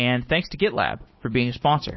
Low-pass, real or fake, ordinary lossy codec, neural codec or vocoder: 7.2 kHz; real; MP3, 24 kbps; none